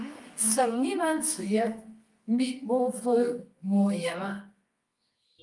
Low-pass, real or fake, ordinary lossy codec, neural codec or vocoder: 10.8 kHz; fake; Opus, 32 kbps; codec, 24 kHz, 0.9 kbps, WavTokenizer, medium music audio release